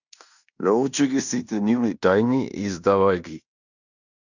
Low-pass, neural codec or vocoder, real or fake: 7.2 kHz; codec, 16 kHz in and 24 kHz out, 0.9 kbps, LongCat-Audio-Codec, fine tuned four codebook decoder; fake